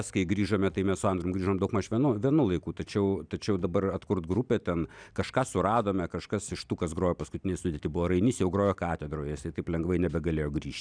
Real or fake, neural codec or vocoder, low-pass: real; none; 9.9 kHz